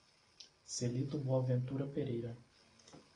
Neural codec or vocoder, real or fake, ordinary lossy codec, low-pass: none; real; AAC, 32 kbps; 9.9 kHz